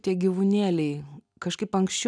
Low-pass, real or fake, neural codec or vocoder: 9.9 kHz; real; none